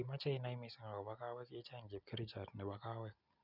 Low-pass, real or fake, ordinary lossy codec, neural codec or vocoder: 5.4 kHz; real; none; none